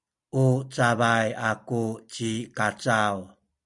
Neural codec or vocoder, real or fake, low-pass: none; real; 10.8 kHz